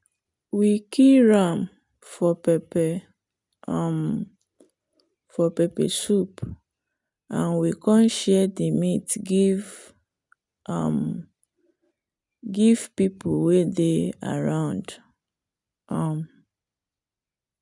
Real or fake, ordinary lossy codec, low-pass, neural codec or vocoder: real; none; 10.8 kHz; none